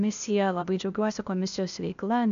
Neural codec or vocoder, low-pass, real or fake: codec, 16 kHz, 0.8 kbps, ZipCodec; 7.2 kHz; fake